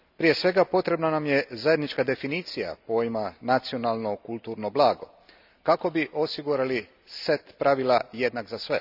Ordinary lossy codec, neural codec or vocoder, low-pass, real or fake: none; none; 5.4 kHz; real